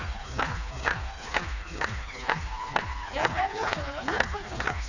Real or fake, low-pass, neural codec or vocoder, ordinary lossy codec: fake; 7.2 kHz; codec, 16 kHz, 2 kbps, FreqCodec, smaller model; none